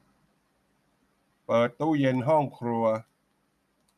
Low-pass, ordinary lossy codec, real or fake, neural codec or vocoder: 14.4 kHz; none; real; none